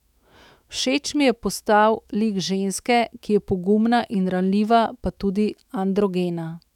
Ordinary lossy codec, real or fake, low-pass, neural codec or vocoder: none; fake; 19.8 kHz; autoencoder, 48 kHz, 128 numbers a frame, DAC-VAE, trained on Japanese speech